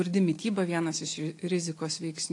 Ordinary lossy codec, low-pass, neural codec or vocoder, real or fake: AAC, 48 kbps; 10.8 kHz; none; real